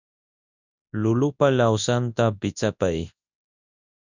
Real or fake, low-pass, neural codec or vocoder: fake; 7.2 kHz; codec, 24 kHz, 0.9 kbps, WavTokenizer, large speech release